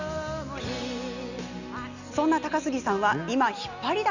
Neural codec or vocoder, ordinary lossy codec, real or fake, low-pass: none; none; real; 7.2 kHz